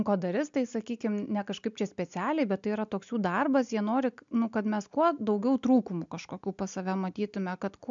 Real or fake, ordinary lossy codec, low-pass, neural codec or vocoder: real; MP3, 64 kbps; 7.2 kHz; none